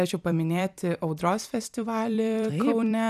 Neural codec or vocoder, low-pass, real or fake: vocoder, 44.1 kHz, 128 mel bands every 256 samples, BigVGAN v2; 14.4 kHz; fake